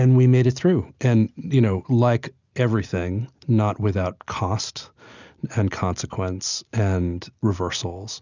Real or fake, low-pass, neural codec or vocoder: real; 7.2 kHz; none